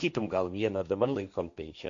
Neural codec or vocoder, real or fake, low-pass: codec, 16 kHz, 1.1 kbps, Voila-Tokenizer; fake; 7.2 kHz